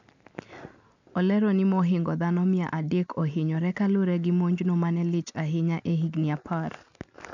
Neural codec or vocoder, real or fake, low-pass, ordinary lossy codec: none; real; 7.2 kHz; none